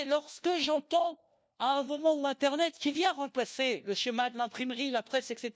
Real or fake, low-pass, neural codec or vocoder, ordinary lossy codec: fake; none; codec, 16 kHz, 1 kbps, FunCodec, trained on LibriTTS, 50 frames a second; none